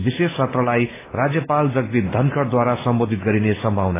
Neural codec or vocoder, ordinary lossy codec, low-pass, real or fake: none; AAC, 16 kbps; 3.6 kHz; real